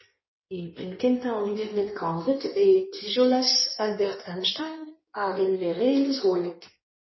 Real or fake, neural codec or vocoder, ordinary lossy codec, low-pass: fake; codec, 16 kHz in and 24 kHz out, 1.1 kbps, FireRedTTS-2 codec; MP3, 24 kbps; 7.2 kHz